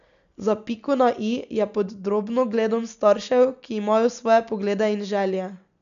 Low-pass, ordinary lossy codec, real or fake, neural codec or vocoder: 7.2 kHz; none; real; none